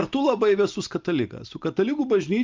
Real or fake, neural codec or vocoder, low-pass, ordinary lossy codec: real; none; 7.2 kHz; Opus, 24 kbps